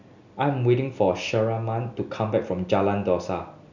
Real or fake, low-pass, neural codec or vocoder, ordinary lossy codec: real; 7.2 kHz; none; none